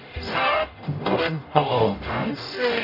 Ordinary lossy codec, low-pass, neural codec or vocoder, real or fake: none; 5.4 kHz; codec, 44.1 kHz, 0.9 kbps, DAC; fake